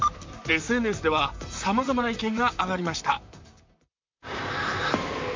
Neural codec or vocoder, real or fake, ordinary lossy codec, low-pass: vocoder, 44.1 kHz, 128 mel bands, Pupu-Vocoder; fake; MP3, 64 kbps; 7.2 kHz